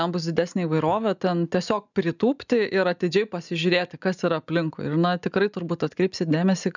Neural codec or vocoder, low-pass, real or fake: none; 7.2 kHz; real